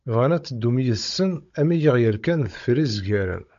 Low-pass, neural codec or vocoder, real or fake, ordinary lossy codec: 7.2 kHz; codec, 16 kHz, 16 kbps, FunCodec, trained on Chinese and English, 50 frames a second; fake; AAC, 64 kbps